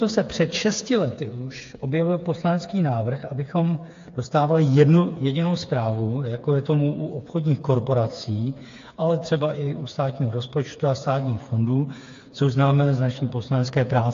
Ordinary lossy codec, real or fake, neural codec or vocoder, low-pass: AAC, 48 kbps; fake; codec, 16 kHz, 4 kbps, FreqCodec, smaller model; 7.2 kHz